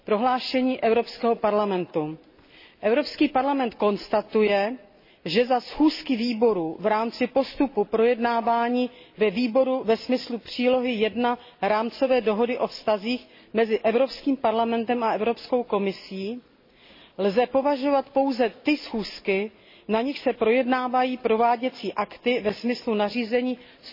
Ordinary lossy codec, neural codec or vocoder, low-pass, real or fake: MP3, 24 kbps; none; 5.4 kHz; real